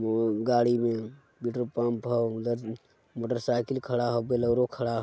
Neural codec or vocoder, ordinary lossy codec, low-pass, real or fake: none; none; none; real